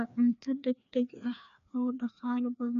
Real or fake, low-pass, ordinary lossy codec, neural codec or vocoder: fake; 7.2 kHz; AAC, 96 kbps; codec, 16 kHz, 2 kbps, FreqCodec, larger model